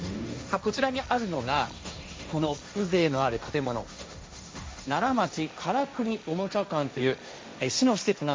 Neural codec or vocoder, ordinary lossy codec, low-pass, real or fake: codec, 16 kHz, 1.1 kbps, Voila-Tokenizer; none; none; fake